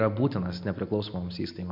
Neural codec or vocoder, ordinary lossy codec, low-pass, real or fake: none; MP3, 48 kbps; 5.4 kHz; real